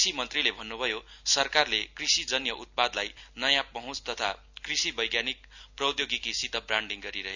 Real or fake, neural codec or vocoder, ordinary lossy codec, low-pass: real; none; none; 7.2 kHz